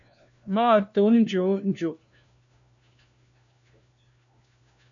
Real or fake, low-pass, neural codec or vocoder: fake; 7.2 kHz; codec, 16 kHz, 1 kbps, FunCodec, trained on LibriTTS, 50 frames a second